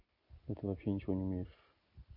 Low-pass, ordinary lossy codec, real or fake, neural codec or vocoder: 5.4 kHz; none; real; none